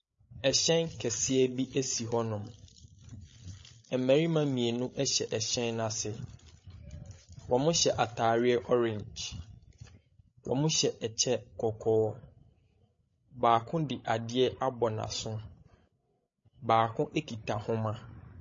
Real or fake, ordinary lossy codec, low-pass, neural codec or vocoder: fake; MP3, 32 kbps; 7.2 kHz; codec, 16 kHz, 16 kbps, FreqCodec, larger model